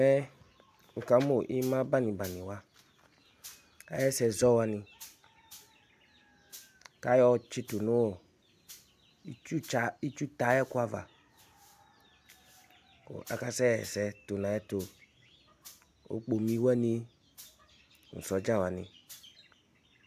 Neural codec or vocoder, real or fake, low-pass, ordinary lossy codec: none; real; 14.4 kHz; AAC, 96 kbps